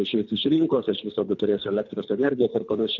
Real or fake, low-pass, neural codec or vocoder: fake; 7.2 kHz; codec, 24 kHz, 3 kbps, HILCodec